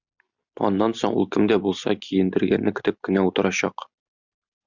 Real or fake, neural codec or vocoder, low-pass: real; none; 7.2 kHz